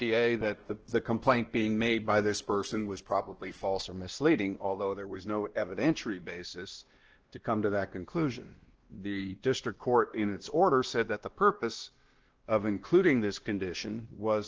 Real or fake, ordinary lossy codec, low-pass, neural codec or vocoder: fake; Opus, 16 kbps; 7.2 kHz; codec, 16 kHz, 1 kbps, X-Codec, WavLM features, trained on Multilingual LibriSpeech